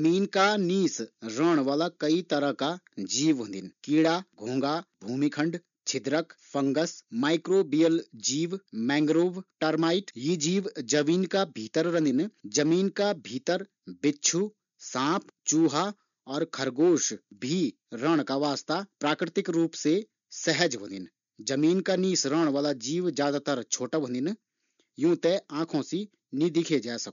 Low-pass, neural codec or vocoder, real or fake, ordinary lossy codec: 7.2 kHz; none; real; none